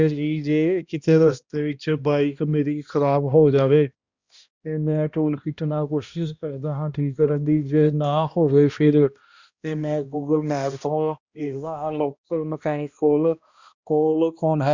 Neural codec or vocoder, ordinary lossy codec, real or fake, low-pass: codec, 16 kHz, 1 kbps, X-Codec, HuBERT features, trained on balanced general audio; Opus, 64 kbps; fake; 7.2 kHz